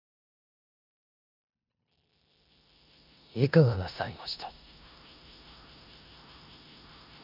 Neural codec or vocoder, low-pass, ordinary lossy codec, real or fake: codec, 16 kHz in and 24 kHz out, 0.9 kbps, LongCat-Audio-Codec, four codebook decoder; 5.4 kHz; none; fake